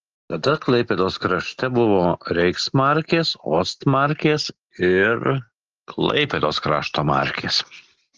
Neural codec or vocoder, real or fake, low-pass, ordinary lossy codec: none; real; 7.2 kHz; Opus, 16 kbps